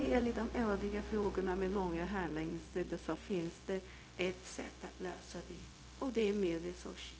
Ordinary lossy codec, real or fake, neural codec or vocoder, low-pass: none; fake; codec, 16 kHz, 0.4 kbps, LongCat-Audio-Codec; none